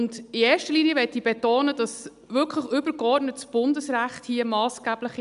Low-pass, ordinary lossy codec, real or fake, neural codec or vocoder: 10.8 kHz; none; real; none